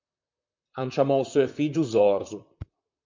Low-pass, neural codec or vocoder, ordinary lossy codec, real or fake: 7.2 kHz; vocoder, 44.1 kHz, 128 mel bands, Pupu-Vocoder; MP3, 64 kbps; fake